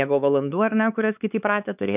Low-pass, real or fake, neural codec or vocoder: 3.6 kHz; fake; codec, 16 kHz, 4 kbps, X-Codec, HuBERT features, trained on LibriSpeech